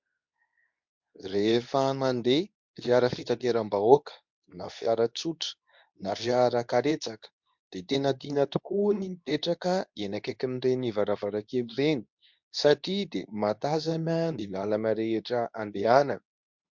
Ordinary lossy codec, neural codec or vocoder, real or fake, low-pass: MP3, 64 kbps; codec, 24 kHz, 0.9 kbps, WavTokenizer, medium speech release version 2; fake; 7.2 kHz